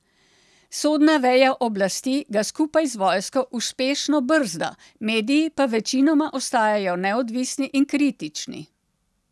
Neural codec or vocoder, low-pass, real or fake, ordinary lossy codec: none; none; real; none